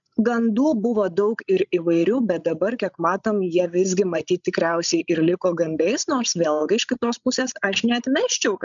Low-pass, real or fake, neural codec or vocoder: 7.2 kHz; fake; codec, 16 kHz, 16 kbps, FreqCodec, larger model